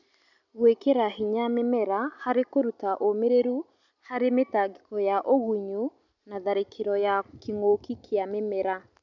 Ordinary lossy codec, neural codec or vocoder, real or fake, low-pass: none; none; real; 7.2 kHz